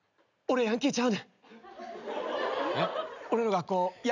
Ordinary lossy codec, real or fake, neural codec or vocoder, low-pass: none; real; none; 7.2 kHz